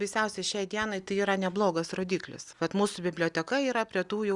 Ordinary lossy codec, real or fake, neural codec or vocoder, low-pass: Opus, 64 kbps; real; none; 10.8 kHz